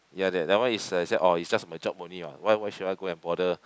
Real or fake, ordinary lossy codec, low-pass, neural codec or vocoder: real; none; none; none